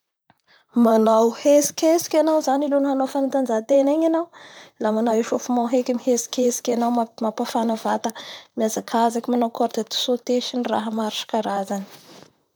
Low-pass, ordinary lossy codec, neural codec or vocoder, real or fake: none; none; vocoder, 44.1 kHz, 128 mel bands, Pupu-Vocoder; fake